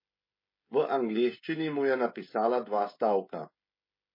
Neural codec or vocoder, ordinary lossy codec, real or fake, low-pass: codec, 16 kHz, 16 kbps, FreqCodec, smaller model; MP3, 24 kbps; fake; 5.4 kHz